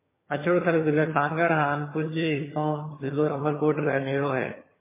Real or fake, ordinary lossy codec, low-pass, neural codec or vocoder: fake; MP3, 16 kbps; 3.6 kHz; vocoder, 22.05 kHz, 80 mel bands, HiFi-GAN